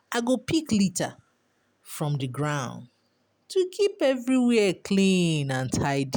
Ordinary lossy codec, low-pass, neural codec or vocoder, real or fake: none; none; none; real